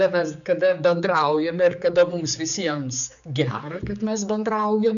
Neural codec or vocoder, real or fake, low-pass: codec, 16 kHz, 4 kbps, X-Codec, HuBERT features, trained on general audio; fake; 7.2 kHz